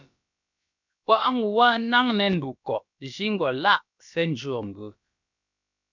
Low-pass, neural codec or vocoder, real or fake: 7.2 kHz; codec, 16 kHz, about 1 kbps, DyCAST, with the encoder's durations; fake